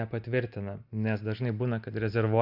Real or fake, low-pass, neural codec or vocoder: real; 5.4 kHz; none